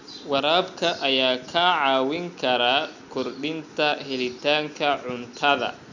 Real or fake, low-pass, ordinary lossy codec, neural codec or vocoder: real; 7.2 kHz; none; none